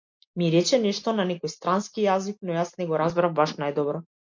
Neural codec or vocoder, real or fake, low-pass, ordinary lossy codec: none; real; 7.2 kHz; MP3, 48 kbps